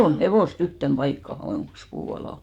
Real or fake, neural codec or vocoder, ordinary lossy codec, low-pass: fake; codec, 44.1 kHz, 7.8 kbps, DAC; Opus, 64 kbps; 19.8 kHz